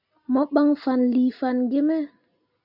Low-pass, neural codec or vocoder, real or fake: 5.4 kHz; none; real